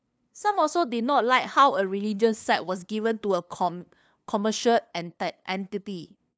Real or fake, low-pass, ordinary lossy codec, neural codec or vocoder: fake; none; none; codec, 16 kHz, 2 kbps, FunCodec, trained on LibriTTS, 25 frames a second